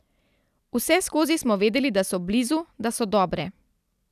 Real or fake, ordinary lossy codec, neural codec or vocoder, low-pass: real; none; none; 14.4 kHz